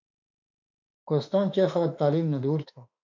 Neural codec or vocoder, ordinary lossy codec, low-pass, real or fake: autoencoder, 48 kHz, 32 numbers a frame, DAC-VAE, trained on Japanese speech; MP3, 64 kbps; 7.2 kHz; fake